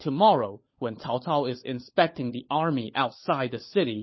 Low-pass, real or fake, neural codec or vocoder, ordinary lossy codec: 7.2 kHz; fake; codec, 16 kHz, 16 kbps, FunCodec, trained on LibriTTS, 50 frames a second; MP3, 24 kbps